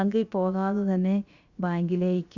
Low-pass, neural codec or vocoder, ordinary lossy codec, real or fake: 7.2 kHz; codec, 16 kHz, about 1 kbps, DyCAST, with the encoder's durations; Opus, 64 kbps; fake